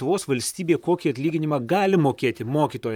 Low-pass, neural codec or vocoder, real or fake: 19.8 kHz; vocoder, 44.1 kHz, 128 mel bands, Pupu-Vocoder; fake